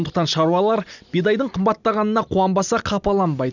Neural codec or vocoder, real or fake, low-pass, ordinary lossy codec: none; real; 7.2 kHz; none